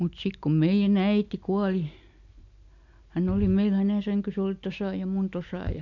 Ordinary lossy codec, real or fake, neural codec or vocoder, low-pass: none; real; none; 7.2 kHz